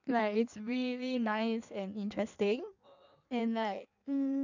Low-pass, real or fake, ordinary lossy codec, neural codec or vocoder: 7.2 kHz; fake; none; codec, 16 kHz in and 24 kHz out, 1.1 kbps, FireRedTTS-2 codec